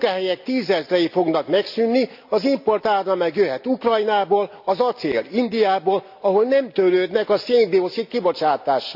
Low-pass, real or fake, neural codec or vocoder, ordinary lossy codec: 5.4 kHz; real; none; AAC, 48 kbps